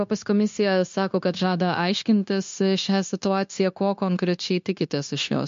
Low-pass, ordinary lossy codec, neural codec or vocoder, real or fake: 7.2 kHz; MP3, 48 kbps; codec, 16 kHz, 0.9 kbps, LongCat-Audio-Codec; fake